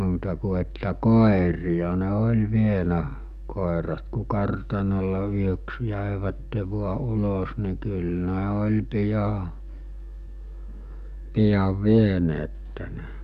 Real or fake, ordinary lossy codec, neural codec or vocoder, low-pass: fake; none; codec, 44.1 kHz, 7.8 kbps, DAC; 14.4 kHz